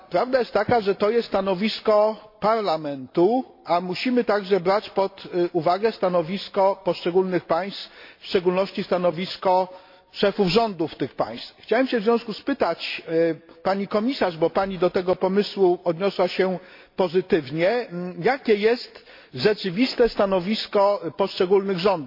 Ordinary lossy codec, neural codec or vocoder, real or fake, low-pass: MP3, 32 kbps; none; real; 5.4 kHz